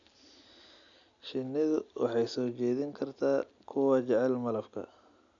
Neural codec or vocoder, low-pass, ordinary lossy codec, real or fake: none; 7.2 kHz; none; real